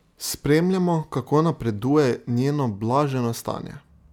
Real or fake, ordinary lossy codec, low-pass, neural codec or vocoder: real; none; 19.8 kHz; none